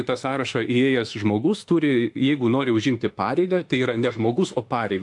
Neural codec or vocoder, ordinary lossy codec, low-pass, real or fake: autoencoder, 48 kHz, 32 numbers a frame, DAC-VAE, trained on Japanese speech; AAC, 64 kbps; 10.8 kHz; fake